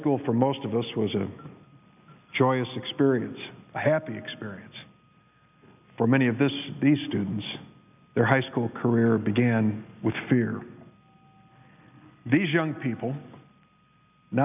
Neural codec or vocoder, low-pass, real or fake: none; 3.6 kHz; real